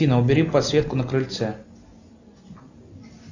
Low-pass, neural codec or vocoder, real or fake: 7.2 kHz; none; real